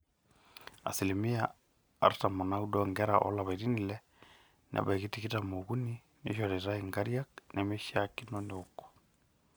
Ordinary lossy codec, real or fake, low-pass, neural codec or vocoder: none; real; none; none